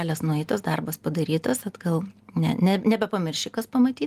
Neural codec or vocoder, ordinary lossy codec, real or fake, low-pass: none; Opus, 24 kbps; real; 14.4 kHz